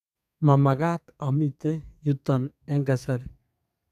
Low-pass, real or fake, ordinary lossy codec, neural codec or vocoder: 14.4 kHz; fake; none; codec, 32 kHz, 1.9 kbps, SNAC